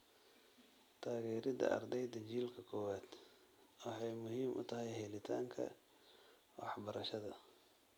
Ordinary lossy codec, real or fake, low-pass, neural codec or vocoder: none; real; none; none